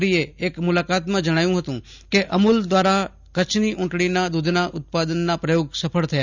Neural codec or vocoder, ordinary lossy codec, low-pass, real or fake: none; none; 7.2 kHz; real